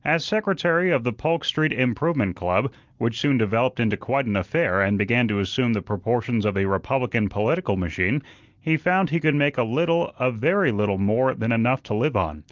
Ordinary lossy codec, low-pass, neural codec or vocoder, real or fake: Opus, 32 kbps; 7.2 kHz; none; real